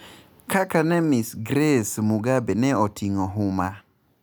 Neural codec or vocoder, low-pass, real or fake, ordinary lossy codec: none; none; real; none